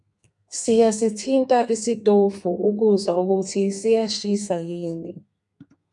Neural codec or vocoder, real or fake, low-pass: codec, 32 kHz, 1.9 kbps, SNAC; fake; 10.8 kHz